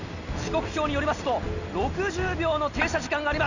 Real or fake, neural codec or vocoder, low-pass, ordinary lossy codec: real; none; 7.2 kHz; none